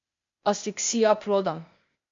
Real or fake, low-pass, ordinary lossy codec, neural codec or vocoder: fake; 7.2 kHz; MP3, 48 kbps; codec, 16 kHz, 0.8 kbps, ZipCodec